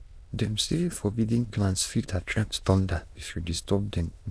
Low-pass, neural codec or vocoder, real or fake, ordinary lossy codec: none; autoencoder, 22.05 kHz, a latent of 192 numbers a frame, VITS, trained on many speakers; fake; none